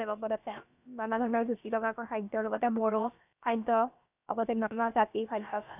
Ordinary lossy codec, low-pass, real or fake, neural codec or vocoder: none; 3.6 kHz; fake; codec, 16 kHz, about 1 kbps, DyCAST, with the encoder's durations